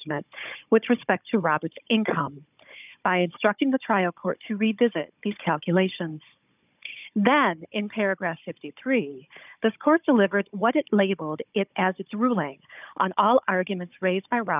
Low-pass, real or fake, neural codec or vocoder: 3.6 kHz; fake; codec, 16 kHz, 16 kbps, FunCodec, trained on Chinese and English, 50 frames a second